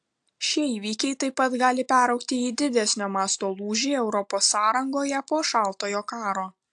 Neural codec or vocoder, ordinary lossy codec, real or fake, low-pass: none; AAC, 64 kbps; real; 9.9 kHz